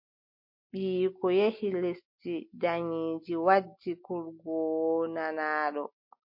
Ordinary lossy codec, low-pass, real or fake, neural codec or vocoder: MP3, 32 kbps; 5.4 kHz; real; none